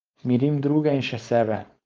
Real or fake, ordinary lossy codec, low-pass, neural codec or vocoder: fake; Opus, 32 kbps; 7.2 kHz; codec, 16 kHz, 4.8 kbps, FACodec